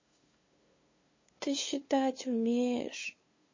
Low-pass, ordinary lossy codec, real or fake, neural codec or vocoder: 7.2 kHz; MP3, 32 kbps; fake; codec, 16 kHz, 2 kbps, FunCodec, trained on LibriTTS, 25 frames a second